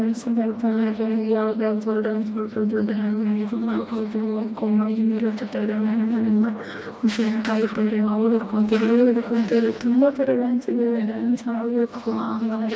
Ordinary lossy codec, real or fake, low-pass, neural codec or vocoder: none; fake; none; codec, 16 kHz, 1 kbps, FreqCodec, smaller model